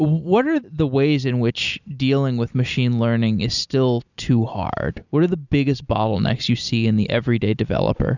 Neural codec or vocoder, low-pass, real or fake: none; 7.2 kHz; real